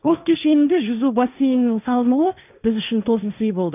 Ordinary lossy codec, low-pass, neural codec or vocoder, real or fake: none; 3.6 kHz; codec, 16 kHz, 1.1 kbps, Voila-Tokenizer; fake